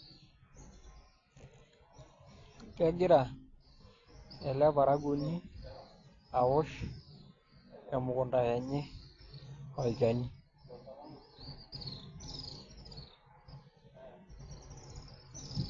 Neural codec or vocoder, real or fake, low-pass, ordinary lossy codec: none; real; 7.2 kHz; AAC, 32 kbps